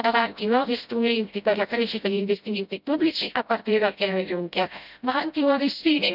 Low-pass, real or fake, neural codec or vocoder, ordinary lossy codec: 5.4 kHz; fake; codec, 16 kHz, 0.5 kbps, FreqCodec, smaller model; none